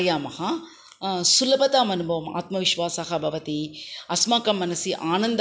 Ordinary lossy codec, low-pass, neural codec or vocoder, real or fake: none; none; none; real